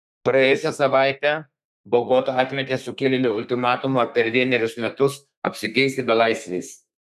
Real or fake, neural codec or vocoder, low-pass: fake; codec, 32 kHz, 1.9 kbps, SNAC; 14.4 kHz